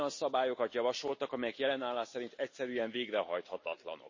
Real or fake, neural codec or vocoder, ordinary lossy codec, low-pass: real; none; MP3, 32 kbps; 7.2 kHz